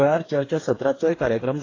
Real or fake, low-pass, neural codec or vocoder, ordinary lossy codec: fake; 7.2 kHz; codec, 44.1 kHz, 2.6 kbps, DAC; AAC, 32 kbps